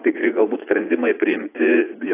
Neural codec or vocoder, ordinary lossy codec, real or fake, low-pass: vocoder, 44.1 kHz, 80 mel bands, Vocos; AAC, 24 kbps; fake; 3.6 kHz